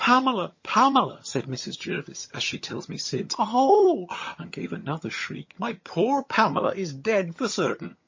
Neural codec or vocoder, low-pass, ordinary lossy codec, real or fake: vocoder, 22.05 kHz, 80 mel bands, HiFi-GAN; 7.2 kHz; MP3, 32 kbps; fake